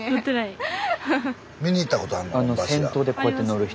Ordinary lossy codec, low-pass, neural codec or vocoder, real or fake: none; none; none; real